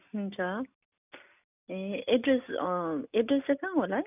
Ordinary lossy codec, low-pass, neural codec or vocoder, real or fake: none; 3.6 kHz; none; real